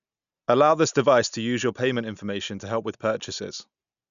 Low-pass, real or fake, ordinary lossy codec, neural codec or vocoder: 7.2 kHz; real; none; none